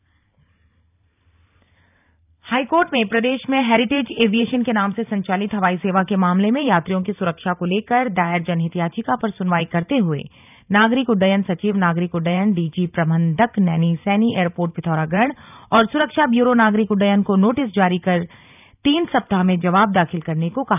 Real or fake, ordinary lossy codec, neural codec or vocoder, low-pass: fake; none; vocoder, 44.1 kHz, 128 mel bands every 512 samples, BigVGAN v2; 3.6 kHz